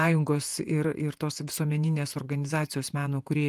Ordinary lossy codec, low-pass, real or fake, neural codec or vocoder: Opus, 32 kbps; 14.4 kHz; fake; vocoder, 48 kHz, 128 mel bands, Vocos